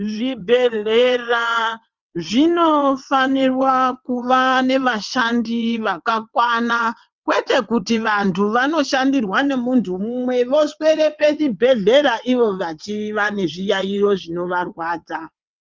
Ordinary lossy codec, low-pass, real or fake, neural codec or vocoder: Opus, 32 kbps; 7.2 kHz; fake; vocoder, 22.05 kHz, 80 mel bands, WaveNeXt